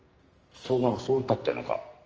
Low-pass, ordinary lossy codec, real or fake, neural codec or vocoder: 7.2 kHz; Opus, 24 kbps; fake; codec, 44.1 kHz, 2.6 kbps, SNAC